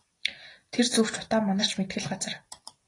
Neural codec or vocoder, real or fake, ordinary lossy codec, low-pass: none; real; AAC, 32 kbps; 10.8 kHz